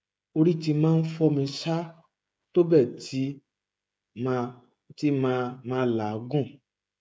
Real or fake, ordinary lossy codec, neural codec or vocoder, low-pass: fake; none; codec, 16 kHz, 16 kbps, FreqCodec, smaller model; none